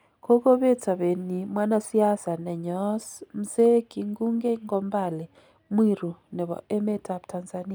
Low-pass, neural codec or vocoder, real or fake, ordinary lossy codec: none; none; real; none